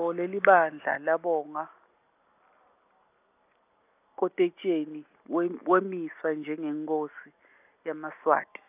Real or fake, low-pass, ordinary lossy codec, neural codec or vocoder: real; 3.6 kHz; none; none